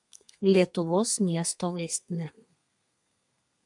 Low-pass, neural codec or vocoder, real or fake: 10.8 kHz; codec, 32 kHz, 1.9 kbps, SNAC; fake